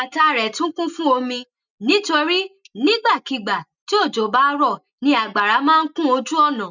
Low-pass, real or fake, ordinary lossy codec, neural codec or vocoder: 7.2 kHz; real; none; none